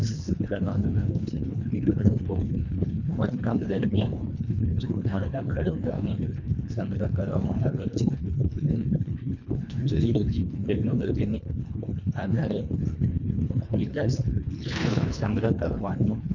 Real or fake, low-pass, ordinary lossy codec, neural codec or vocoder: fake; 7.2 kHz; none; codec, 24 kHz, 1.5 kbps, HILCodec